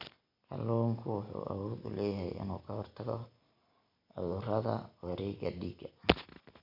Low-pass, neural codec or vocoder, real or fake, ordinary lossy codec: 5.4 kHz; none; real; none